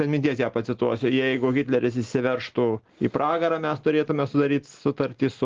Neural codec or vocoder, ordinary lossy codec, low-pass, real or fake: none; Opus, 16 kbps; 7.2 kHz; real